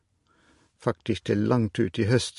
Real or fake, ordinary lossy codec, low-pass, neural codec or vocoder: real; MP3, 48 kbps; 14.4 kHz; none